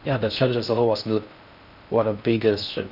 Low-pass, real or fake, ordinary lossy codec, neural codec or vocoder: 5.4 kHz; fake; none; codec, 16 kHz in and 24 kHz out, 0.6 kbps, FocalCodec, streaming, 4096 codes